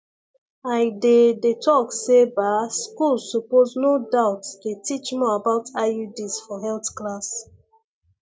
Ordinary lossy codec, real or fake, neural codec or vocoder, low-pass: none; real; none; none